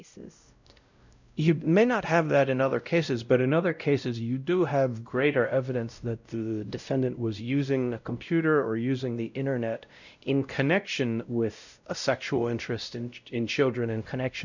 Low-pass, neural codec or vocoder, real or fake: 7.2 kHz; codec, 16 kHz, 0.5 kbps, X-Codec, WavLM features, trained on Multilingual LibriSpeech; fake